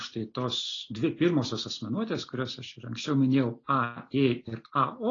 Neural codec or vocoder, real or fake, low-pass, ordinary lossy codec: none; real; 7.2 kHz; AAC, 32 kbps